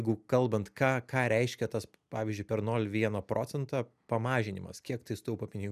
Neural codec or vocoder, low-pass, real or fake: none; 14.4 kHz; real